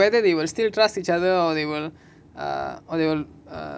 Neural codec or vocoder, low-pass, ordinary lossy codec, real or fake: none; none; none; real